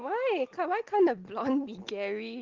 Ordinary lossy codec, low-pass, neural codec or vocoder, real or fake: Opus, 16 kbps; 7.2 kHz; none; real